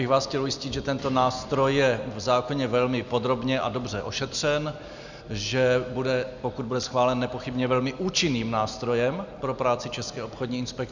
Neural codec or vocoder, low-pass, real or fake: none; 7.2 kHz; real